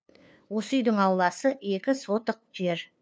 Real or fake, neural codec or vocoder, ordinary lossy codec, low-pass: fake; codec, 16 kHz, 2 kbps, FunCodec, trained on LibriTTS, 25 frames a second; none; none